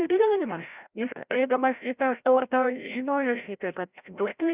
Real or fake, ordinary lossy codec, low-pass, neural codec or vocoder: fake; Opus, 64 kbps; 3.6 kHz; codec, 16 kHz, 0.5 kbps, FreqCodec, larger model